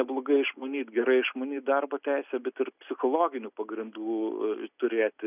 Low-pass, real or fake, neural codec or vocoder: 3.6 kHz; real; none